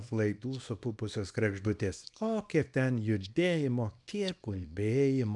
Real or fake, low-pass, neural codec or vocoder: fake; 10.8 kHz; codec, 24 kHz, 0.9 kbps, WavTokenizer, small release